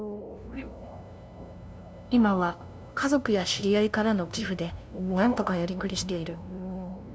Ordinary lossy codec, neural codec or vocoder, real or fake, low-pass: none; codec, 16 kHz, 0.5 kbps, FunCodec, trained on LibriTTS, 25 frames a second; fake; none